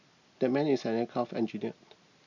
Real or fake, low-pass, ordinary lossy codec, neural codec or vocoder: real; 7.2 kHz; none; none